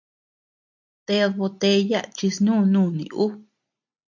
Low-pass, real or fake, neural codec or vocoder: 7.2 kHz; real; none